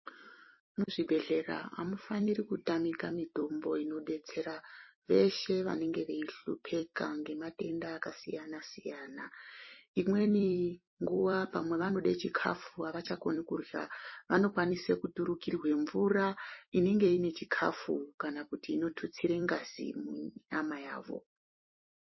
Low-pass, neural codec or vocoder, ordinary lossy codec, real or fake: 7.2 kHz; vocoder, 44.1 kHz, 128 mel bands every 256 samples, BigVGAN v2; MP3, 24 kbps; fake